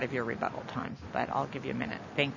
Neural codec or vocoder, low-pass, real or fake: none; 7.2 kHz; real